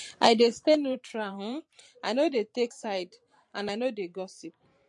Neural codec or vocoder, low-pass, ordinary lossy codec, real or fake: vocoder, 44.1 kHz, 128 mel bands every 512 samples, BigVGAN v2; 10.8 kHz; MP3, 48 kbps; fake